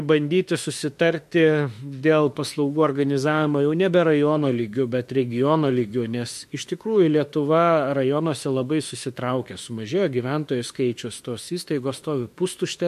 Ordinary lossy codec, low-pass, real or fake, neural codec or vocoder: MP3, 64 kbps; 14.4 kHz; fake; autoencoder, 48 kHz, 32 numbers a frame, DAC-VAE, trained on Japanese speech